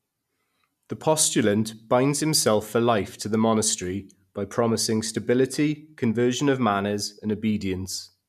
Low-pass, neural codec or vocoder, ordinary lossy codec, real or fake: 14.4 kHz; none; none; real